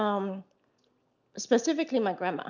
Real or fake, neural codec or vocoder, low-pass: real; none; 7.2 kHz